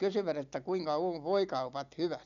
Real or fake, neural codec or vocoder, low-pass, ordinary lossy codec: real; none; 7.2 kHz; none